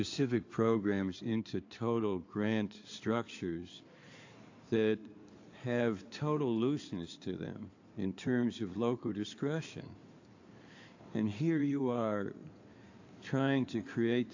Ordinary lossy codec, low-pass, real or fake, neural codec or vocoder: AAC, 48 kbps; 7.2 kHz; fake; codec, 16 kHz in and 24 kHz out, 2.2 kbps, FireRedTTS-2 codec